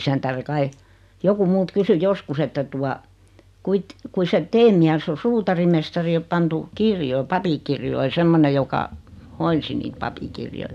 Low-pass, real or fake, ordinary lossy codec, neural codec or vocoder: 14.4 kHz; real; none; none